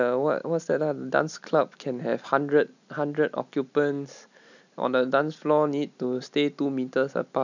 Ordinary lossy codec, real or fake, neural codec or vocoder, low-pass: none; real; none; 7.2 kHz